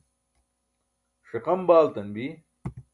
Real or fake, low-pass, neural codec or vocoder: real; 10.8 kHz; none